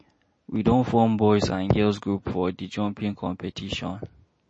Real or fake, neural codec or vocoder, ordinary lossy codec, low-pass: real; none; MP3, 32 kbps; 9.9 kHz